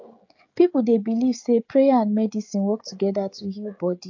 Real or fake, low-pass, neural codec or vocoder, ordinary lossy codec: fake; 7.2 kHz; codec, 16 kHz, 16 kbps, FreqCodec, smaller model; AAC, 48 kbps